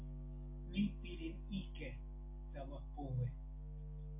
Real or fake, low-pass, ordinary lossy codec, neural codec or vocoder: real; 3.6 kHz; MP3, 24 kbps; none